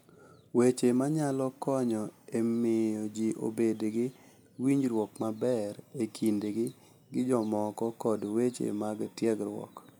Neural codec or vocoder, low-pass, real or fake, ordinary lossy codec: none; none; real; none